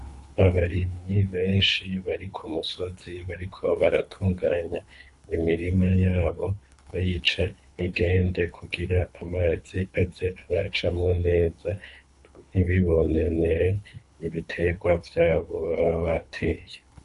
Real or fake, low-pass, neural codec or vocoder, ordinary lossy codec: fake; 10.8 kHz; codec, 24 kHz, 3 kbps, HILCodec; AAC, 64 kbps